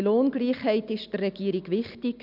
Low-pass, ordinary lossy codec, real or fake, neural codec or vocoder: 5.4 kHz; none; real; none